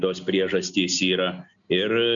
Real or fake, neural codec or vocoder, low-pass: real; none; 7.2 kHz